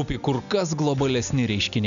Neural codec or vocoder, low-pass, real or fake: none; 7.2 kHz; real